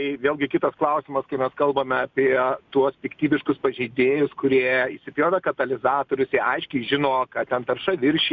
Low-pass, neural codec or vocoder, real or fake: 7.2 kHz; none; real